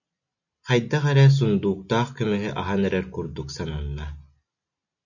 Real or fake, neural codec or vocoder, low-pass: real; none; 7.2 kHz